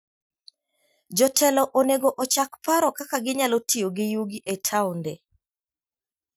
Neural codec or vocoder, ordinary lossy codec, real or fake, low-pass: none; none; real; none